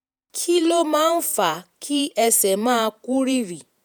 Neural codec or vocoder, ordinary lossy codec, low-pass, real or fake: vocoder, 48 kHz, 128 mel bands, Vocos; none; none; fake